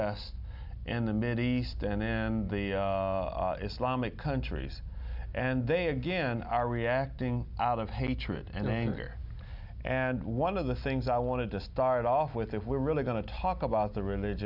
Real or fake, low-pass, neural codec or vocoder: real; 5.4 kHz; none